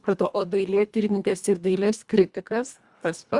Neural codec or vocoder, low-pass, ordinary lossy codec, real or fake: codec, 24 kHz, 1.5 kbps, HILCodec; 10.8 kHz; Opus, 64 kbps; fake